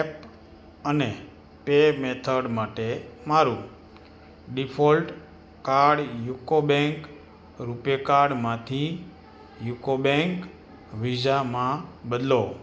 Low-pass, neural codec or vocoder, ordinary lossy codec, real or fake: none; none; none; real